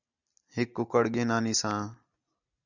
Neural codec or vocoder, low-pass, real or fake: none; 7.2 kHz; real